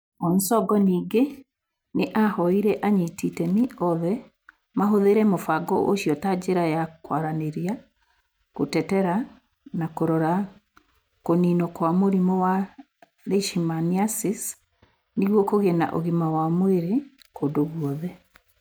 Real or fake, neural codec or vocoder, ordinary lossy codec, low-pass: real; none; none; none